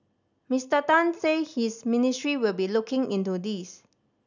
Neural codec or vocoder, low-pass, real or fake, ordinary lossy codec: none; 7.2 kHz; real; none